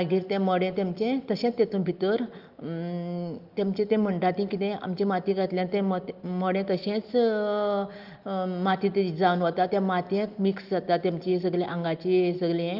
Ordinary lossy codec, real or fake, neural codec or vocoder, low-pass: Opus, 32 kbps; real; none; 5.4 kHz